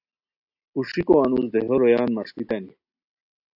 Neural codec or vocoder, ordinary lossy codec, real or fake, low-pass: none; AAC, 48 kbps; real; 5.4 kHz